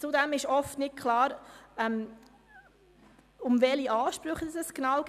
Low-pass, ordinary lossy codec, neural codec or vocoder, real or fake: 14.4 kHz; none; none; real